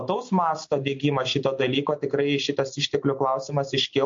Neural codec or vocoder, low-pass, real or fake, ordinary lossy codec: none; 7.2 kHz; real; MP3, 48 kbps